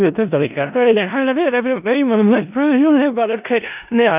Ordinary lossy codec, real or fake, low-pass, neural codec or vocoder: none; fake; 3.6 kHz; codec, 16 kHz in and 24 kHz out, 0.4 kbps, LongCat-Audio-Codec, four codebook decoder